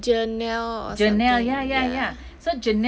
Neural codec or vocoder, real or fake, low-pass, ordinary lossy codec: none; real; none; none